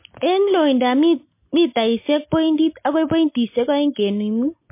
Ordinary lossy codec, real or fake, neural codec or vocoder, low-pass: MP3, 24 kbps; real; none; 3.6 kHz